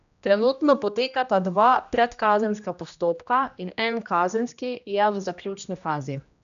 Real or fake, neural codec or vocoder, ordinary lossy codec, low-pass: fake; codec, 16 kHz, 1 kbps, X-Codec, HuBERT features, trained on general audio; none; 7.2 kHz